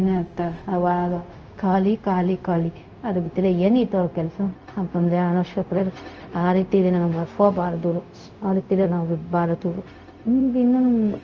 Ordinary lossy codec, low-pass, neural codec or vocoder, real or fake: Opus, 24 kbps; 7.2 kHz; codec, 16 kHz, 0.4 kbps, LongCat-Audio-Codec; fake